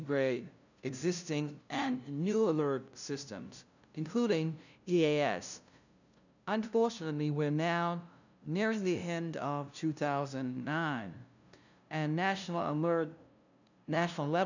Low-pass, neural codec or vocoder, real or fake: 7.2 kHz; codec, 16 kHz, 0.5 kbps, FunCodec, trained on LibriTTS, 25 frames a second; fake